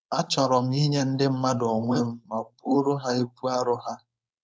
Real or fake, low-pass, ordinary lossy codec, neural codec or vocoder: fake; none; none; codec, 16 kHz, 4.8 kbps, FACodec